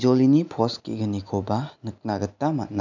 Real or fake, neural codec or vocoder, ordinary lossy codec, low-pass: real; none; none; 7.2 kHz